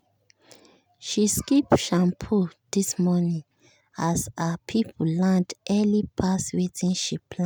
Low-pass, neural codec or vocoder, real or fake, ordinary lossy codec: none; none; real; none